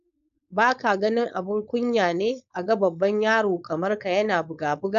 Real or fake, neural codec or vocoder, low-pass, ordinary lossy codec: fake; codec, 16 kHz, 4.8 kbps, FACodec; 7.2 kHz; none